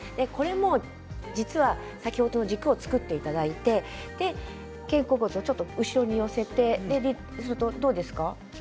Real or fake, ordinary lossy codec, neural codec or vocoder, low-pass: real; none; none; none